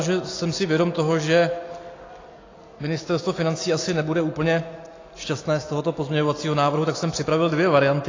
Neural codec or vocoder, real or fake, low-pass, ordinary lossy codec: none; real; 7.2 kHz; AAC, 32 kbps